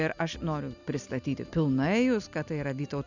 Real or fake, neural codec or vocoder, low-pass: real; none; 7.2 kHz